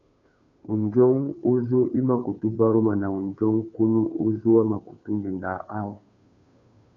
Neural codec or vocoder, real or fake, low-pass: codec, 16 kHz, 2 kbps, FunCodec, trained on Chinese and English, 25 frames a second; fake; 7.2 kHz